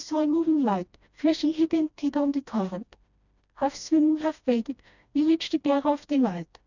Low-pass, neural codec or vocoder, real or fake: 7.2 kHz; codec, 16 kHz, 1 kbps, FreqCodec, smaller model; fake